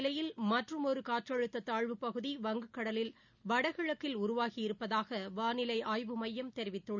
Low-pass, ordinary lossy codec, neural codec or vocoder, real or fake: 7.2 kHz; none; none; real